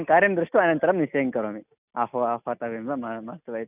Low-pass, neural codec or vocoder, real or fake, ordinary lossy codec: 3.6 kHz; none; real; none